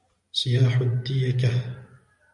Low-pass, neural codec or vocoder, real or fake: 10.8 kHz; none; real